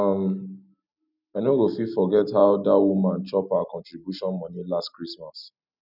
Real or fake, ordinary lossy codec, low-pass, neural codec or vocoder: real; none; 5.4 kHz; none